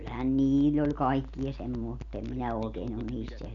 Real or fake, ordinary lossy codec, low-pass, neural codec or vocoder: real; none; 7.2 kHz; none